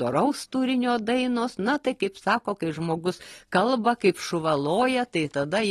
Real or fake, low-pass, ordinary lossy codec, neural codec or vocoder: real; 19.8 kHz; AAC, 32 kbps; none